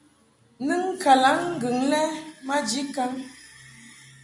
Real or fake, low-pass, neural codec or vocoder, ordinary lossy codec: real; 10.8 kHz; none; MP3, 64 kbps